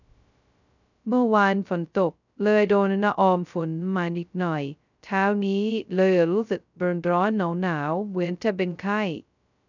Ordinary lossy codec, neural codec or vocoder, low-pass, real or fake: none; codec, 16 kHz, 0.2 kbps, FocalCodec; 7.2 kHz; fake